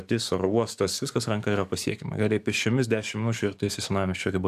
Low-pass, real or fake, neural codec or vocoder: 14.4 kHz; fake; codec, 44.1 kHz, 7.8 kbps, DAC